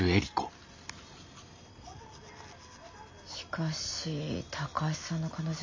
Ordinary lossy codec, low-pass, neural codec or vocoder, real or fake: MP3, 48 kbps; 7.2 kHz; none; real